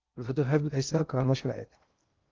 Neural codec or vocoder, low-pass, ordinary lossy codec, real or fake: codec, 16 kHz in and 24 kHz out, 0.6 kbps, FocalCodec, streaming, 2048 codes; 7.2 kHz; Opus, 32 kbps; fake